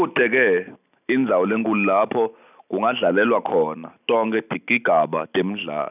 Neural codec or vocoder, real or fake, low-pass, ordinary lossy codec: none; real; 3.6 kHz; none